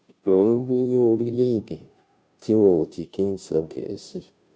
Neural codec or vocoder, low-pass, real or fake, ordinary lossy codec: codec, 16 kHz, 0.5 kbps, FunCodec, trained on Chinese and English, 25 frames a second; none; fake; none